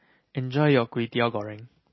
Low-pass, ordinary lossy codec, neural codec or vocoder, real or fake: 7.2 kHz; MP3, 24 kbps; none; real